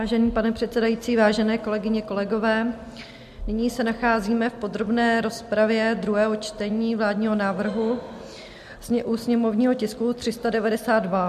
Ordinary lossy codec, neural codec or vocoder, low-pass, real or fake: MP3, 64 kbps; none; 14.4 kHz; real